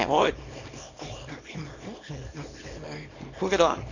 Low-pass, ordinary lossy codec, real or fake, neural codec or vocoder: 7.2 kHz; AAC, 48 kbps; fake; codec, 24 kHz, 0.9 kbps, WavTokenizer, small release